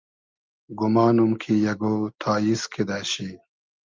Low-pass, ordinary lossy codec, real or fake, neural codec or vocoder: 7.2 kHz; Opus, 24 kbps; real; none